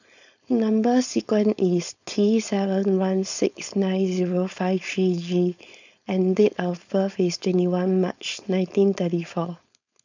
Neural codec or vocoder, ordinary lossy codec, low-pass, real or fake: codec, 16 kHz, 4.8 kbps, FACodec; none; 7.2 kHz; fake